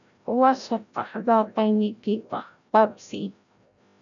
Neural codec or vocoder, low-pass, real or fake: codec, 16 kHz, 0.5 kbps, FreqCodec, larger model; 7.2 kHz; fake